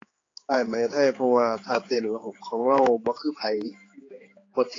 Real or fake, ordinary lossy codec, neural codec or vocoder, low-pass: fake; AAC, 32 kbps; codec, 16 kHz, 4 kbps, X-Codec, HuBERT features, trained on general audio; 7.2 kHz